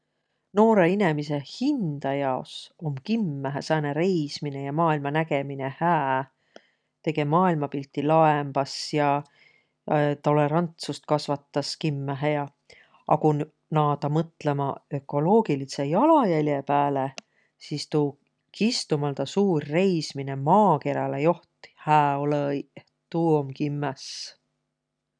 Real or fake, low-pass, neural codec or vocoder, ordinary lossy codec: real; 9.9 kHz; none; none